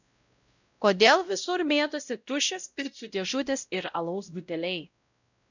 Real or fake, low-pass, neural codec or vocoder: fake; 7.2 kHz; codec, 16 kHz, 0.5 kbps, X-Codec, WavLM features, trained on Multilingual LibriSpeech